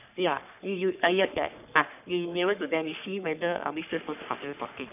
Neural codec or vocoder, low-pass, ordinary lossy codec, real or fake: codec, 44.1 kHz, 3.4 kbps, Pupu-Codec; 3.6 kHz; none; fake